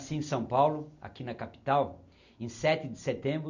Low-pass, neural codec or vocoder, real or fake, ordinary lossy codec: 7.2 kHz; none; real; none